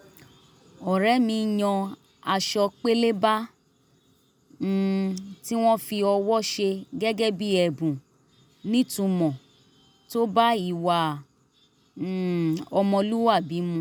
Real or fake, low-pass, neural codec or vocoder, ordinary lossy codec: real; none; none; none